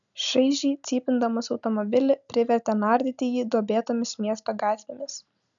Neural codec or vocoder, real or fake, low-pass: none; real; 7.2 kHz